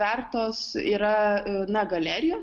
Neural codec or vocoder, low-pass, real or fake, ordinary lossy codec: none; 10.8 kHz; real; Opus, 32 kbps